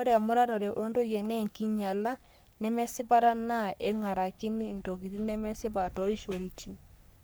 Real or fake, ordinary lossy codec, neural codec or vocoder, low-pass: fake; none; codec, 44.1 kHz, 3.4 kbps, Pupu-Codec; none